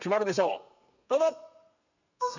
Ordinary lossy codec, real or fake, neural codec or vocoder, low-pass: none; fake; codec, 32 kHz, 1.9 kbps, SNAC; 7.2 kHz